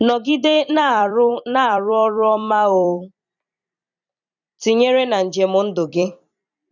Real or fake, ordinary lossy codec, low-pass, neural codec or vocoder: fake; none; 7.2 kHz; vocoder, 44.1 kHz, 128 mel bands every 256 samples, BigVGAN v2